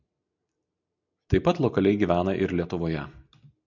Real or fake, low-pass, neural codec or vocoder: real; 7.2 kHz; none